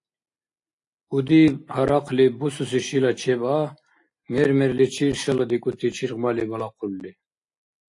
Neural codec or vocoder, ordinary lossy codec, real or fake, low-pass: none; AAC, 48 kbps; real; 10.8 kHz